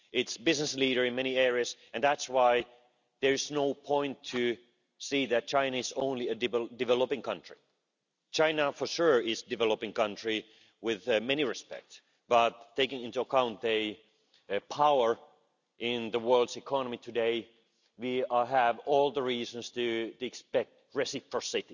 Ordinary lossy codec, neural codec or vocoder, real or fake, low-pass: none; none; real; 7.2 kHz